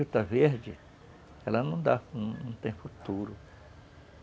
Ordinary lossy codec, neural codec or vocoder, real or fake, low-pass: none; none; real; none